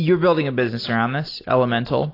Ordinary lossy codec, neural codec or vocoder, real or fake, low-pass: AAC, 32 kbps; none; real; 5.4 kHz